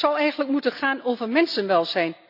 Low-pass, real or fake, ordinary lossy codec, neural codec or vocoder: 5.4 kHz; real; none; none